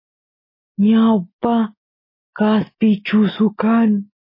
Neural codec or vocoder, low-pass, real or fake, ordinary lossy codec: none; 5.4 kHz; real; MP3, 24 kbps